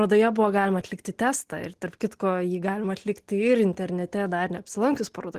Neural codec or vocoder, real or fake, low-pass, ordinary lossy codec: none; real; 14.4 kHz; Opus, 16 kbps